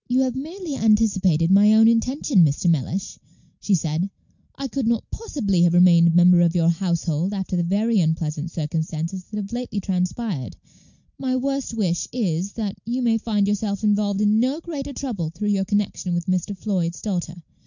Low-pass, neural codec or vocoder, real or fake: 7.2 kHz; none; real